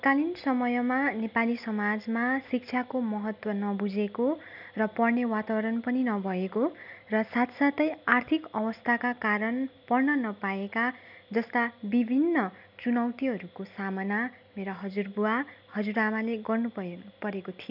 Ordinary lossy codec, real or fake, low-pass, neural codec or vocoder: none; real; 5.4 kHz; none